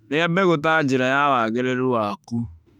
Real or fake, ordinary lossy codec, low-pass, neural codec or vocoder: fake; none; 19.8 kHz; autoencoder, 48 kHz, 32 numbers a frame, DAC-VAE, trained on Japanese speech